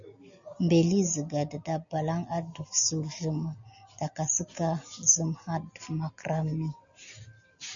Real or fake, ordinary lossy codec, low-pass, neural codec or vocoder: real; MP3, 96 kbps; 7.2 kHz; none